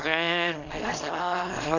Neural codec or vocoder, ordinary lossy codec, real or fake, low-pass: codec, 24 kHz, 0.9 kbps, WavTokenizer, small release; none; fake; 7.2 kHz